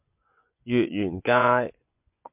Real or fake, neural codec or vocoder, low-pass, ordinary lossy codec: fake; vocoder, 24 kHz, 100 mel bands, Vocos; 3.6 kHz; MP3, 32 kbps